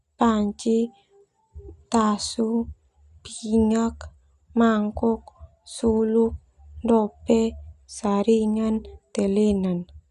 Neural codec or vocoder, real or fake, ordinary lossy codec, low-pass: none; real; Opus, 64 kbps; 10.8 kHz